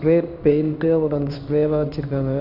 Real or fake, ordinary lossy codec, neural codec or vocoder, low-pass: fake; none; codec, 16 kHz in and 24 kHz out, 1 kbps, XY-Tokenizer; 5.4 kHz